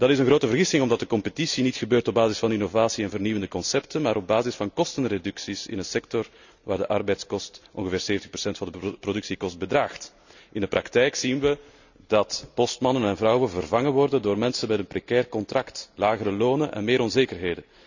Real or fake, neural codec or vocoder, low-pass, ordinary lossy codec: real; none; 7.2 kHz; none